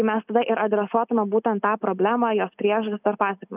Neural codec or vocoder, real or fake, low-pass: none; real; 3.6 kHz